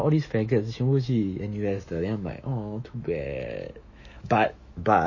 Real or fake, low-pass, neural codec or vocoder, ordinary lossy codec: fake; 7.2 kHz; autoencoder, 48 kHz, 128 numbers a frame, DAC-VAE, trained on Japanese speech; MP3, 32 kbps